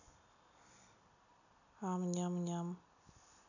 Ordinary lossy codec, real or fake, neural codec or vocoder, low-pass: none; real; none; 7.2 kHz